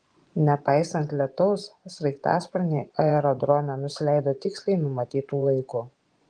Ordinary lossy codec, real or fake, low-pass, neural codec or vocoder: Opus, 24 kbps; fake; 9.9 kHz; vocoder, 24 kHz, 100 mel bands, Vocos